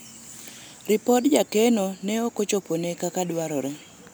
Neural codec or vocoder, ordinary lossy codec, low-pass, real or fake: none; none; none; real